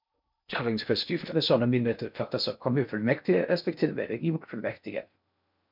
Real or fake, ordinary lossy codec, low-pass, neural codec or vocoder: fake; MP3, 48 kbps; 5.4 kHz; codec, 16 kHz in and 24 kHz out, 0.6 kbps, FocalCodec, streaming, 2048 codes